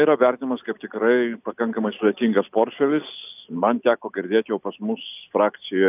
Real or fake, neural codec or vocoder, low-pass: real; none; 3.6 kHz